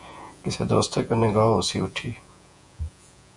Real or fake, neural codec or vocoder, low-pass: fake; vocoder, 48 kHz, 128 mel bands, Vocos; 10.8 kHz